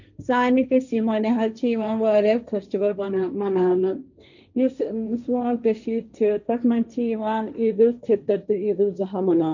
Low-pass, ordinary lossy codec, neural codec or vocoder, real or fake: 7.2 kHz; none; codec, 16 kHz, 1.1 kbps, Voila-Tokenizer; fake